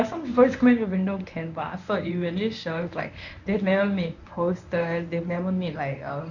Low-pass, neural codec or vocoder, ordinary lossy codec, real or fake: 7.2 kHz; codec, 24 kHz, 0.9 kbps, WavTokenizer, medium speech release version 1; none; fake